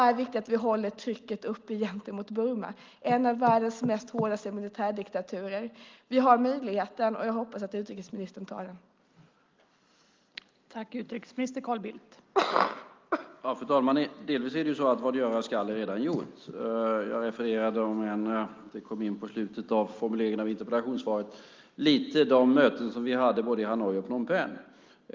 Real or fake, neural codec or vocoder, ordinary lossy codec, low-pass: real; none; Opus, 32 kbps; 7.2 kHz